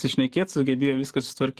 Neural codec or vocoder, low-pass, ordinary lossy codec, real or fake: codec, 44.1 kHz, 7.8 kbps, DAC; 14.4 kHz; Opus, 16 kbps; fake